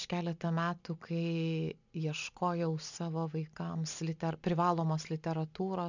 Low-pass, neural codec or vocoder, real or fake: 7.2 kHz; none; real